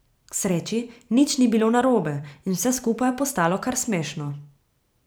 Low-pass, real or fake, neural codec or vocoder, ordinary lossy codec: none; fake; vocoder, 44.1 kHz, 128 mel bands every 512 samples, BigVGAN v2; none